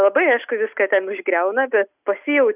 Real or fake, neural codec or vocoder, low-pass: real; none; 3.6 kHz